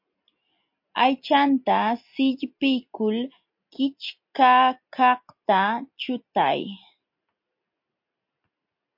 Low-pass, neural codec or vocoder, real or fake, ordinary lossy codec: 5.4 kHz; none; real; MP3, 32 kbps